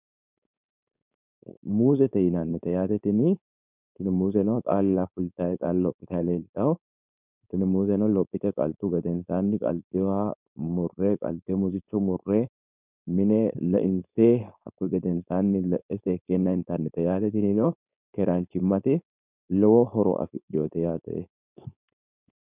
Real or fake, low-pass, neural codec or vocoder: fake; 3.6 kHz; codec, 16 kHz, 4.8 kbps, FACodec